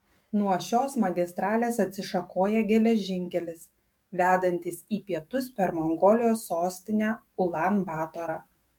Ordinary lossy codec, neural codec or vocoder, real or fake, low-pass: MP3, 96 kbps; codec, 44.1 kHz, 7.8 kbps, DAC; fake; 19.8 kHz